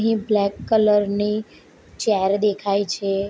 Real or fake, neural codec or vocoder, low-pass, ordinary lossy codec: real; none; none; none